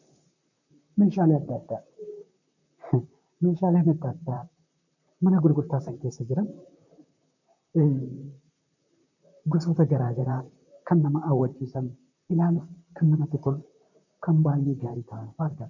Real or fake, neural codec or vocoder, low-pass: fake; vocoder, 44.1 kHz, 128 mel bands, Pupu-Vocoder; 7.2 kHz